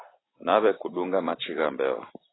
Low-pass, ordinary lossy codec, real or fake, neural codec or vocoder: 7.2 kHz; AAC, 16 kbps; real; none